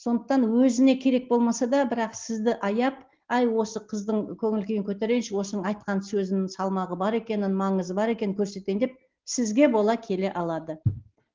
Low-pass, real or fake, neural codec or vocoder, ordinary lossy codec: 7.2 kHz; real; none; Opus, 16 kbps